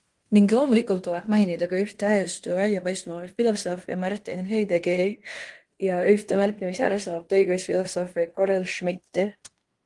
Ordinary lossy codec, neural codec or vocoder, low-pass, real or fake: Opus, 24 kbps; codec, 16 kHz in and 24 kHz out, 0.9 kbps, LongCat-Audio-Codec, fine tuned four codebook decoder; 10.8 kHz; fake